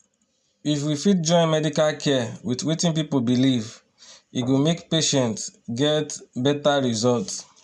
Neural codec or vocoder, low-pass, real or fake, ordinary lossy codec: none; none; real; none